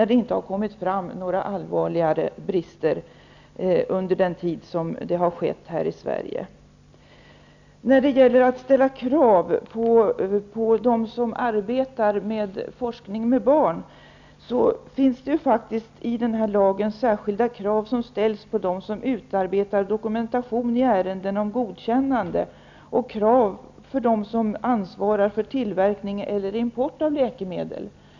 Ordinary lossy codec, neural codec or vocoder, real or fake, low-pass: none; none; real; 7.2 kHz